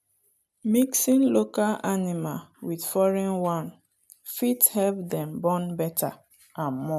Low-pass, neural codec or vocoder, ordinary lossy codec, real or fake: 14.4 kHz; none; none; real